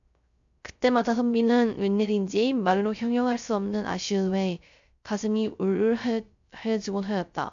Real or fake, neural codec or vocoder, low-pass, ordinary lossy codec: fake; codec, 16 kHz, 0.3 kbps, FocalCodec; 7.2 kHz; AAC, 64 kbps